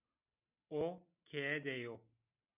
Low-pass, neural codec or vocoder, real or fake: 3.6 kHz; none; real